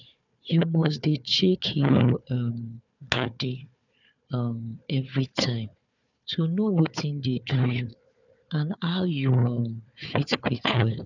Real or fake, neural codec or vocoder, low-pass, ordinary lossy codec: fake; codec, 16 kHz, 4 kbps, FunCodec, trained on Chinese and English, 50 frames a second; 7.2 kHz; none